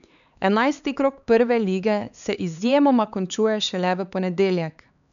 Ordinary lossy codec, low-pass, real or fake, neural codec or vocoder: none; 7.2 kHz; fake; codec, 16 kHz, 4 kbps, X-Codec, HuBERT features, trained on LibriSpeech